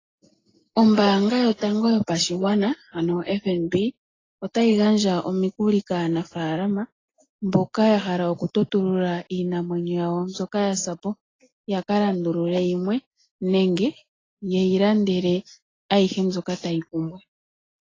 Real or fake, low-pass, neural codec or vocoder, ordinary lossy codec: real; 7.2 kHz; none; AAC, 32 kbps